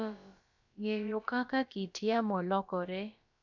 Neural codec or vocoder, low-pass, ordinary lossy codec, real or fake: codec, 16 kHz, about 1 kbps, DyCAST, with the encoder's durations; 7.2 kHz; none; fake